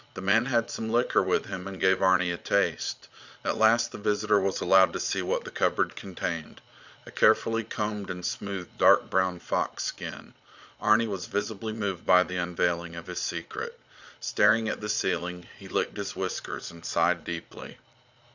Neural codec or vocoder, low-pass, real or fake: vocoder, 22.05 kHz, 80 mel bands, Vocos; 7.2 kHz; fake